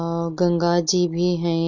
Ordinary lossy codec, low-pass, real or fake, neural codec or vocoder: none; 7.2 kHz; real; none